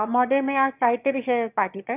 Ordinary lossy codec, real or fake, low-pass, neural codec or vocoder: none; fake; 3.6 kHz; autoencoder, 22.05 kHz, a latent of 192 numbers a frame, VITS, trained on one speaker